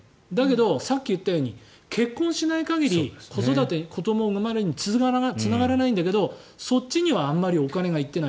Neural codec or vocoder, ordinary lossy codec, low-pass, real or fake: none; none; none; real